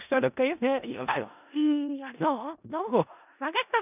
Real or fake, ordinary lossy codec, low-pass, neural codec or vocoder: fake; none; 3.6 kHz; codec, 16 kHz in and 24 kHz out, 0.4 kbps, LongCat-Audio-Codec, four codebook decoder